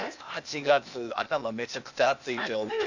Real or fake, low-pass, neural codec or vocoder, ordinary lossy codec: fake; 7.2 kHz; codec, 16 kHz, 0.8 kbps, ZipCodec; none